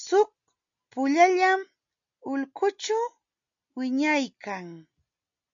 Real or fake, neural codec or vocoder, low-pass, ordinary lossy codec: real; none; 7.2 kHz; AAC, 64 kbps